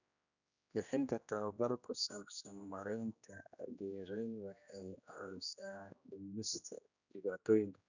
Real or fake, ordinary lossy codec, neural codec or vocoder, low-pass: fake; none; codec, 16 kHz, 1 kbps, X-Codec, HuBERT features, trained on general audio; 7.2 kHz